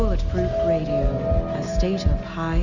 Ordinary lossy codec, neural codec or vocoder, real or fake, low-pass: MP3, 64 kbps; none; real; 7.2 kHz